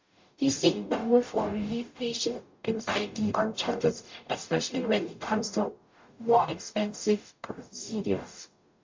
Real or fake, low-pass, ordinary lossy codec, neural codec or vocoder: fake; 7.2 kHz; MP3, 48 kbps; codec, 44.1 kHz, 0.9 kbps, DAC